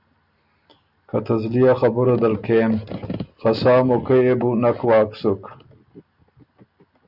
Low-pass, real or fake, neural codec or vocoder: 5.4 kHz; real; none